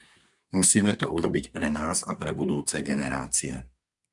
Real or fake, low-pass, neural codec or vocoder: fake; 10.8 kHz; codec, 24 kHz, 1 kbps, SNAC